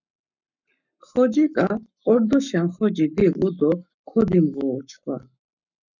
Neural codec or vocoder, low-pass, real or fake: codec, 44.1 kHz, 7.8 kbps, Pupu-Codec; 7.2 kHz; fake